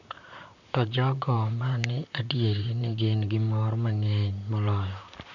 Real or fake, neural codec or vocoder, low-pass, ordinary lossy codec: fake; vocoder, 44.1 kHz, 128 mel bands, Pupu-Vocoder; 7.2 kHz; none